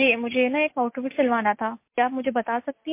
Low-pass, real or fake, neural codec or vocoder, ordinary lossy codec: 3.6 kHz; real; none; MP3, 24 kbps